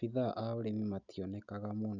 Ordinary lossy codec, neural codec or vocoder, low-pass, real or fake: none; none; 7.2 kHz; real